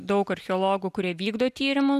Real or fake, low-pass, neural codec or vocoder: real; 14.4 kHz; none